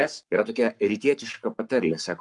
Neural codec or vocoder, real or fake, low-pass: codec, 44.1 kHz, 7.8 kbps, Pupu-Codec; fake; 10.8 kHz